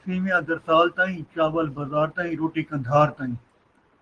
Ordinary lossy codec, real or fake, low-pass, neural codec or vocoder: Opus, 16 kbps; real; 9.9 kHz; none